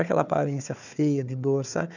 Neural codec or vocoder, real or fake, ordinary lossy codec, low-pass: codec, 16 kHz, 4 kbps, FunCodec, trained on Chinese and English, 50 frames a second; fake; none; 7.2 kHz